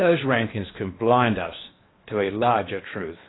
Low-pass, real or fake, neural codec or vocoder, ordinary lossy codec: 7.2 kHz; fake; codec, 16 kHz in and 24 kHz out, 0.8 kbps, FocalCodec, streaming, 65536 codes; AAC, 16 kbps